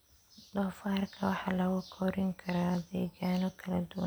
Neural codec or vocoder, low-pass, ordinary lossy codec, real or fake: none; none; none; real